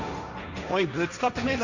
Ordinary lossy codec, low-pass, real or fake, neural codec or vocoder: none; 7.2 kHz; fake; codec, 16 kHz, 1.1 kbps, Voila-Tokenizer